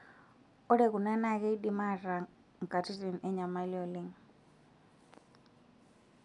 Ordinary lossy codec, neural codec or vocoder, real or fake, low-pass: MP3, 96 kbps; none; real; 10.8 kHz